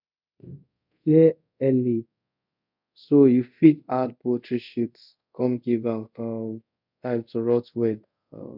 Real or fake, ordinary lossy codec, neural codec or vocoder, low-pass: fake; none; codec, 24 kHz, 0.5 kbps, DualCodec; 5.4 kHz